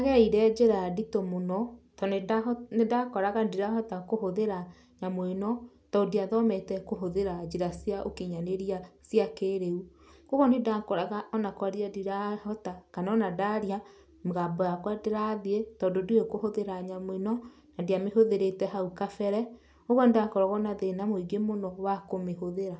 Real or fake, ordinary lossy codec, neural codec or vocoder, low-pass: real; none; none; none